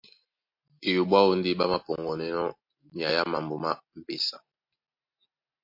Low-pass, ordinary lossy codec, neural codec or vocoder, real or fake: 5.4 kHz; MP3, 32 kbps; none; real